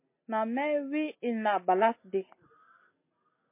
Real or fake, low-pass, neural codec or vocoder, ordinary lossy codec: real; 3.6 kHz; none; MP3, 24 kbps